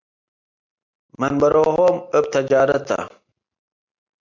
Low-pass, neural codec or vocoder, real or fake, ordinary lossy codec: 7.2 kHz; none; real; MP3, 64 kbps